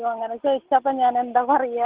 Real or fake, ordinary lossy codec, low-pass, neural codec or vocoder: real; Opus, 16 kbps; 3.6 kHz; none